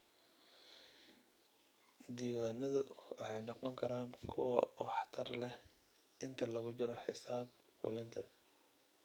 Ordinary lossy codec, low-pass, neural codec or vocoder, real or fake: none; none; codec, 44.1 kHz, 2.6 kbps, SNAC; fake